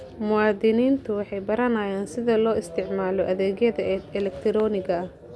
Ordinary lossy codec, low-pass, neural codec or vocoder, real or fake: none; none; none; real